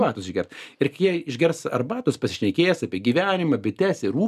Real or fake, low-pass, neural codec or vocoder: real; 14.4 kHz; none